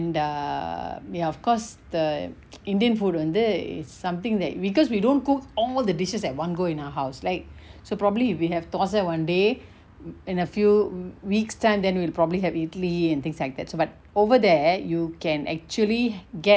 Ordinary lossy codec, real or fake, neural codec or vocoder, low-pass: none; real; none; none